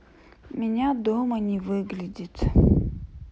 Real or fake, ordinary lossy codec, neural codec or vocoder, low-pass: real; none; none; none